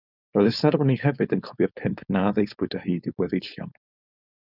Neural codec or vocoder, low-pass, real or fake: codec, 16 kHz, 4.8 kbps, FACodec; 5.4 kHz; fake